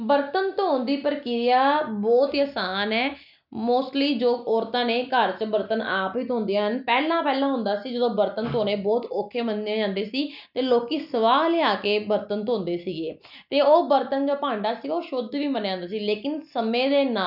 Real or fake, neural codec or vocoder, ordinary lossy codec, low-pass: real; none; none; 5.4 kHz